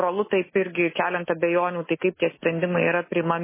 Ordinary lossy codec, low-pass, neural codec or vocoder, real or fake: MP3, 16 kbps; 3.6 kHz; none; real